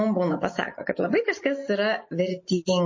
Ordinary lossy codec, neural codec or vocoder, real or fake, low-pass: MP3, 32 kbps; none; real; 7.2 kHz